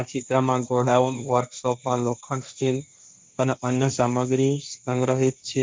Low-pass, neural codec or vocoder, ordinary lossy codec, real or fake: none; codec, 16 kHz, 1.1 kbps, Voila-Tokenizer; none; fake